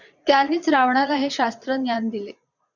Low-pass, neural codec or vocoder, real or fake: 7.2 kHz; vocoder, 22.05 kHz, 80 mel bands, Vocos; fake